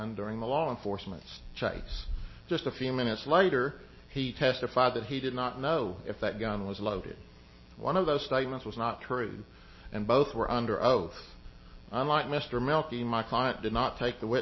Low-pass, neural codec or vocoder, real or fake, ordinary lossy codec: 7.2 kHz; none; real; MP3, 24 kbps